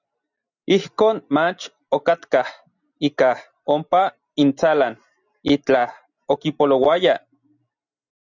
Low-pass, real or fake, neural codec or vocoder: 7.2 kHz; real; none